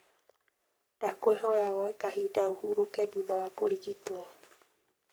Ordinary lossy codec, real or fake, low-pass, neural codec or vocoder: none; fake; none; codec, 44.1 kHz, 3.4 kbps, Pupu-Codec